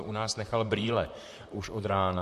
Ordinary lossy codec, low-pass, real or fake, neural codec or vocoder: MP3, 64 kbps; 14.4 kHz; fake; vocoder, 44.1 kHz, 128 mel bands, Pupu-Vocoder